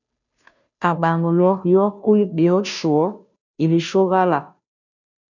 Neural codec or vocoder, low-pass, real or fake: codec, 16 kHz, 0.5 kbps, FunCodec, trained on Chinese and English, 25 frames a second; 7.2 kHz; fake